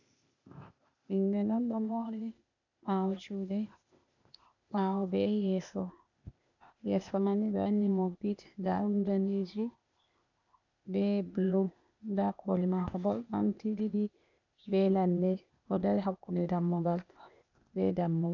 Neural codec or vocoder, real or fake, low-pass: codec, 16 kHz, 0.8 kbps, ZipCodec; fake; 7.2 kHz